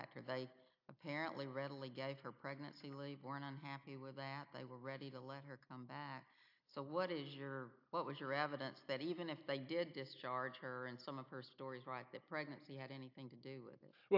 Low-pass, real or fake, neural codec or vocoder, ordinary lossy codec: 5.4 kHz; real; none; AAC, 48 kbps